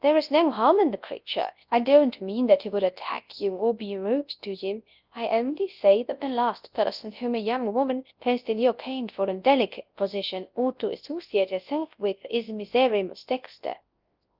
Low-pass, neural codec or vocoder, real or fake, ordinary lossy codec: 5.4 kHz; codec, 24 kHz, 0.9 kbps, WavTokenizer, large speech release; fake; Opus, 32 kbps